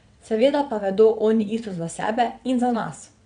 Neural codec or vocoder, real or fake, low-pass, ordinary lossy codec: vocoder, 22.05 kHz, 80 mel bands, WaveNeXt; fake; 9.9 kHz; none